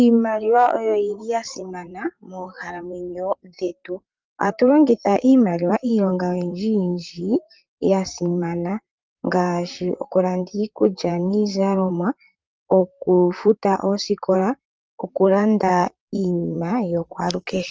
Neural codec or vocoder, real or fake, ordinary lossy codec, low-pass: vocoder, 44.1 kHz, 128 mel bands, Pupu-Vocoder; fake; Opus, 24 kbps; 7.2 kHz